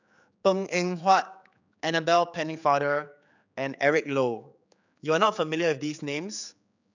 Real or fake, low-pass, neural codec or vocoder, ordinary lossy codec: fake; 7.2 kHz; codec, 16 kHz, 4 kbps, X-Codec, HuBERT features, trained on general audio; none